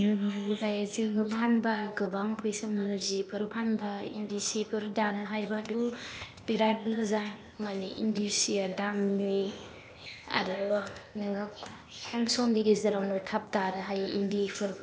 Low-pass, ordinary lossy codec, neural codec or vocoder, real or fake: none; none; codec, 16 kHz, 0.8 kbps, ZipCodec; fake